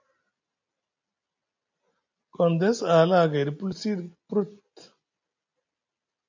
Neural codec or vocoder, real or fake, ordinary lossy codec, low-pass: none; real; AAC, 48 kbps; 7.2 kHz